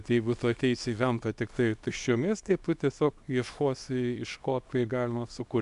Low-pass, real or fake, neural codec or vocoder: 10.8 kHz; fake; codec, 24 kHz, 0.9 kbps, WavTokenizer, small release